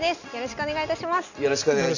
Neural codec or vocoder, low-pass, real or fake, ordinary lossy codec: none; 7.2 kHz; real; none